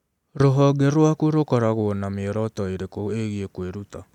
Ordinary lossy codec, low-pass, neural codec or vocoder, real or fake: none; 19.8 kHz; none; real